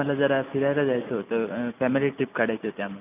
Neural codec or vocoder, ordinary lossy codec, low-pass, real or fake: none; none; 3.6 kHz; real